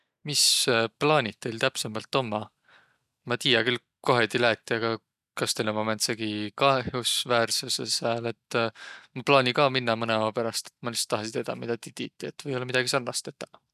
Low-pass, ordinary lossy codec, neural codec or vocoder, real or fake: 14.4 kHz; none; none; real